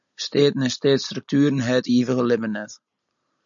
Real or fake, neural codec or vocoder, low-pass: real; none; 7.2 kHz